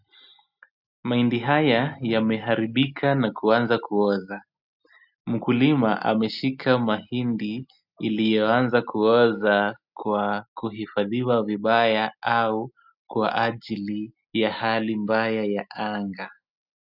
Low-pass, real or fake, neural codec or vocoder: 5.4 kHz; real; none